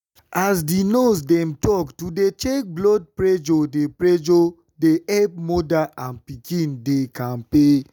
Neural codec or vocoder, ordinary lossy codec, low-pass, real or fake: none; none; 19.8 kHz; real